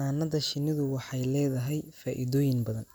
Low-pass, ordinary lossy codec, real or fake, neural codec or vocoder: none; none; real; none